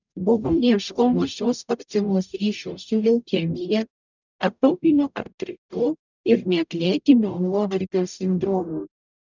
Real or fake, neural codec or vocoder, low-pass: fake; codec, 44.1 kHz, 0.9 kbps, DAC; 7.2 kHz